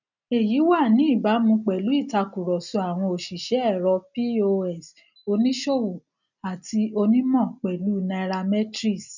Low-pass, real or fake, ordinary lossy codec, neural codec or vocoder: 7.2 kHz; real; none; none